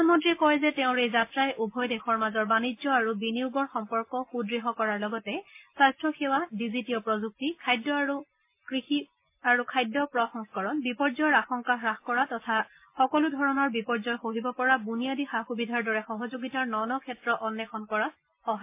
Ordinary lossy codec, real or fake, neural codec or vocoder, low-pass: MP3, 24 kbps; real; none; 3.6 kHz